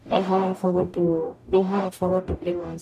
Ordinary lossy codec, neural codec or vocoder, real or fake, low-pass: none; codec, 44.1 kHz, 0.9 kbps, DAC; fake; 14.4 kHz